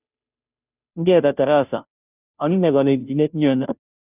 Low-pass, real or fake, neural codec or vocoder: 3.6 kHz; fake; codec, 16 kHz, 0.5 kbps, FunCodec, trained on Chinese and English, 25 frames a second